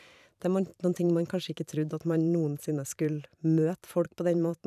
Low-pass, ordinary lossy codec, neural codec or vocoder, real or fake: 14.4 kHz; none; vocoder, 44.1 kHz, 128 mel bands every 512 samples, BigVGAN v2; fake